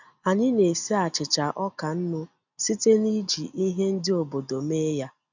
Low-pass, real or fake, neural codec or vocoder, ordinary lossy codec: 7.2 kHz; real; none; none